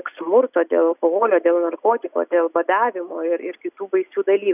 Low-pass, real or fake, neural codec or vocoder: 3.6 kHz; real; none